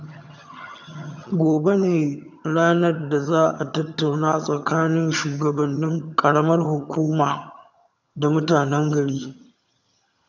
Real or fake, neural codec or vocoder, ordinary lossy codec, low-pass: fake; vocoder, 22.05 kHz, 80 mel bands, HiFi-GAN; none; 7.2 kHz